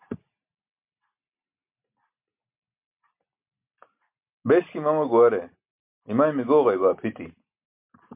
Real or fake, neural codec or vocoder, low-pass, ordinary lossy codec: real; none; 3.6 kHz; MP3, 32 kbps